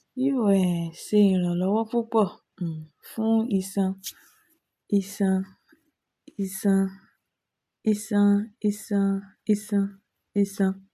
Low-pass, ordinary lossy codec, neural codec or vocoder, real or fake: 14.4 kHz; none; none; real